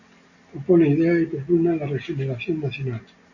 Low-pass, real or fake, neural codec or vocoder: 7.2 kHz; real; none